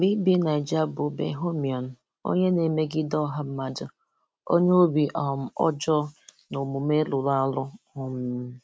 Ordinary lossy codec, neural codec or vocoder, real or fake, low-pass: none; none; real; none